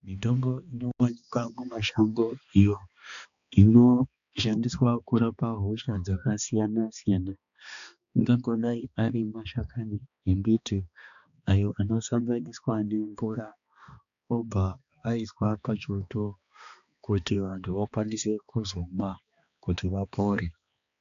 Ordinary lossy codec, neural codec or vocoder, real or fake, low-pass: AAC, 96 kbps; codec, 16 kHz, 2 kbps, X-Codec, HuBERT features, trained on balanced general audio; fake; 7.2 kHz